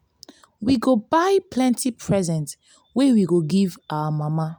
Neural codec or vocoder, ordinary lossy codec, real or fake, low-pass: none; none; real; none